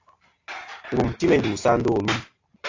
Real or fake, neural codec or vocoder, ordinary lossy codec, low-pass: real; none; AAC, 48 kbps; 7.2 kHz